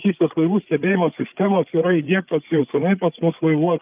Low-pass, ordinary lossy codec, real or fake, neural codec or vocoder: 3.6 kHz; Opus, 24 kbps; fake; codec, 16 kHz, 8 kbps, FreqCodec, larger model